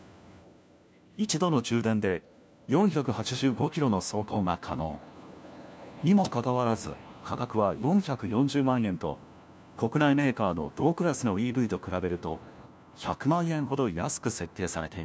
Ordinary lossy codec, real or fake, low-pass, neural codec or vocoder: none; fake; none; codec, 16 kHz, 1 kbps, FunCodec, trained on LibriTTS, 50 frames a second